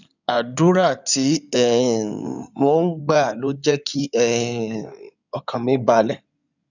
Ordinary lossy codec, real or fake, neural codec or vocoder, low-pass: none; fake; codec, 16 kHz in and 24 kHz out, 2.2 kbps, FireRedTTS-2 codec; 7.2 kHz